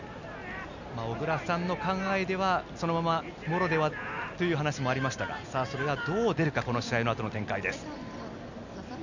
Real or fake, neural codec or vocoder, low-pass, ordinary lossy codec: real; none; 7.2 kHz; none